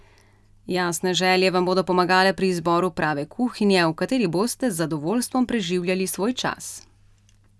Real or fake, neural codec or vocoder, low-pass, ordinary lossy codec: real; none; none; none